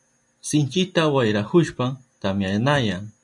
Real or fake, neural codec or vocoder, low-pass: real; none; 10.8 kHz